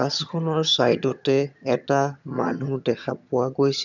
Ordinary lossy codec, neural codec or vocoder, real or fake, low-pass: none; vocoder, 22.05 kHz, 80 mel bands, HiFi-GAN; fake; 7.2 kHz